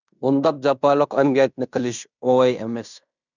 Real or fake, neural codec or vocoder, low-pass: fake; codec, 16 kHz in and 24 kHz out, 0.9 kbps, LongCat-Audio-Codec, fine tuned four codebook decoder; 7.2 kHz